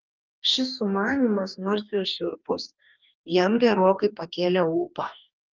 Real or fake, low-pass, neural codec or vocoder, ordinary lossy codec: fake; 7.2 kHz; codec, 44.1 kHz, 2.6 kbps, DAC; Opus, 32 kbps